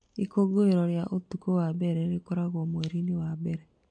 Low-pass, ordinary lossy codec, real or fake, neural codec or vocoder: 9.9 kHz; MP3, 48 kbps; real; none